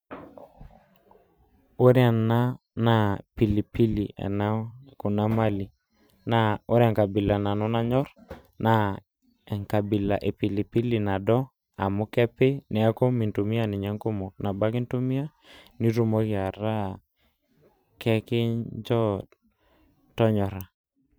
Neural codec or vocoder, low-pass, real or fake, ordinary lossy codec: vocoder, 44.1 kHz, 128 mel bands every 512 samples, BigVGAN v2; none; fake; none